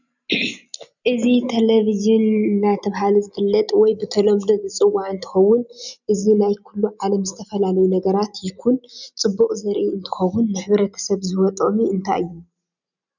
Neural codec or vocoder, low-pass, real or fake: none; 7.2 kHz; real